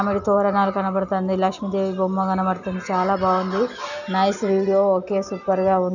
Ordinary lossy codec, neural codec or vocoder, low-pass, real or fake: none; none; 7.2 kHz; real